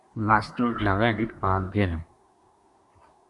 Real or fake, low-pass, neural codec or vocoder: fake; 10.8 kHz; codec, 24 kHz, 1 kbps, SNAC